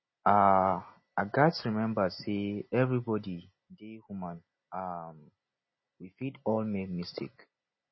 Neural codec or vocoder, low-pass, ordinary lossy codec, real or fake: none; 7.2 kHz; MP3, 24 kbps; real